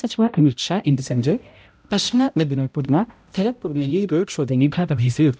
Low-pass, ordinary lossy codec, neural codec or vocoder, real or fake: none; none; codec, 16 kHz, 0.5 kbps, X-Codec, HuBERT features, trained on balanced general audio; fake